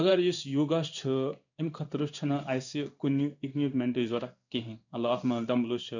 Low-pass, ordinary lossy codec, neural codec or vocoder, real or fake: 7.2 kHz; none; codec, 16 kHz in and 24 kHz out, 1 kbps, XY-Tokenizer; fake